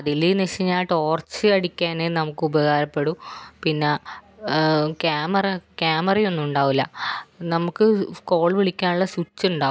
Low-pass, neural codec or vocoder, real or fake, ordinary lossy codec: none; none; real; none